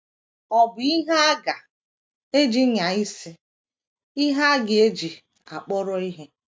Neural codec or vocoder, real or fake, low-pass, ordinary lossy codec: none; real; none; none